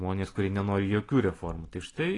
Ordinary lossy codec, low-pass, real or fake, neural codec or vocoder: AAC, 32 kbps; 10.8 kHz; real; none